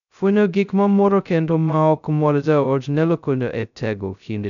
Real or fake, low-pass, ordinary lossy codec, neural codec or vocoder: fake; 7.2 kHz; none; codec, 16 kHz, 0.2 kbps, FocalCodec